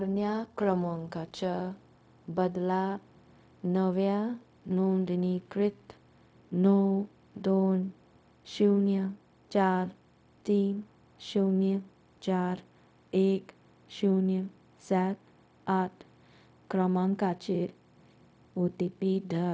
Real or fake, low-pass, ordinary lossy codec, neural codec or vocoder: fake; none; none; codec, 16 kHz, 0.4 kbps, LongCat-Audio-Codec